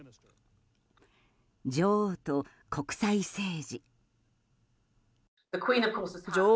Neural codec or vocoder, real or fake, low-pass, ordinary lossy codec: none; real; none; none